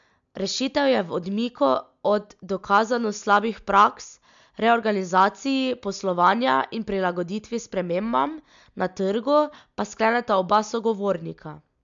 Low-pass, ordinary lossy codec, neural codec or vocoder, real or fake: 7.2 kHz; MP3, 64 kbps; none; real